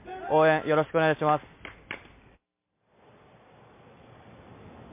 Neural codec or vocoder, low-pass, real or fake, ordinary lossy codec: none; 3.6 kHz; real; MP3, 24 kbps